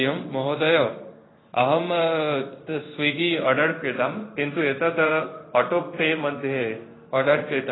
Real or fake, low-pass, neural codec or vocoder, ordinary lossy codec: fake; 7.2 kHz; codec, 16 kHz in and 24 kHz out, 1 kbps, XY-Tokenizer; AAC, 16 kbps